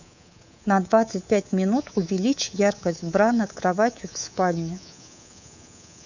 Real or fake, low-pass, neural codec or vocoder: fake; 7.2 kHz; codec, 24 kHz, 3.1 kbps, DualCodec